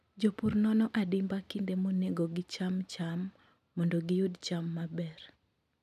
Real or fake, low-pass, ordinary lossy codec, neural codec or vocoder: real; 14.4 kHz; none; none